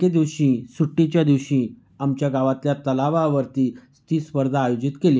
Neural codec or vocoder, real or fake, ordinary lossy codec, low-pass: none; real; none; none